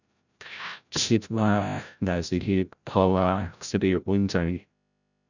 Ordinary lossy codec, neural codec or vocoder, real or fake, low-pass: none; codec, 16 kHz, 0.5 kbps, FreqCodec, larger model; fake; 7.2 kHz